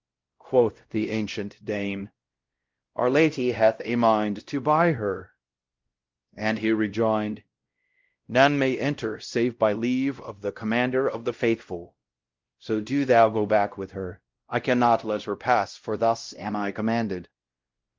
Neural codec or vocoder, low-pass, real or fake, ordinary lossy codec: codec, 16 kHz, 0.5 kbps, X-Codec, WavLM features, trained on Multilingual LibriSpeech; 7.2 kHz; fake; Opus, 32 kbps